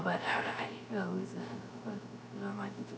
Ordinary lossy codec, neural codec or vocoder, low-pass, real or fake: none; codec, 16 kHz, 0.3 kbps, FocalCodec; none; fake